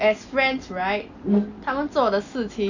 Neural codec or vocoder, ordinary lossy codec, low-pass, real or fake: none; none; 7.2 kHz; real